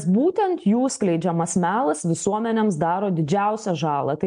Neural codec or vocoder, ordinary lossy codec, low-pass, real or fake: none; MP3, 64 kbps; 9.9 kHz; real